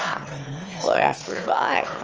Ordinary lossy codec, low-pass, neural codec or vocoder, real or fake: Opus, 24 kbps; 7.2 kHz; autoencoder, 22.05 kHz, a latent of 192 numbers a frame, VITS, trained on one speaker; fake